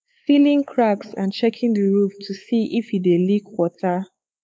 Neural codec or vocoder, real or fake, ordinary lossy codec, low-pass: codec, 16 kHz, 4 kbps, X-Codec, WavLM features, trained on Multilingual LibriSpeech; fake; none; none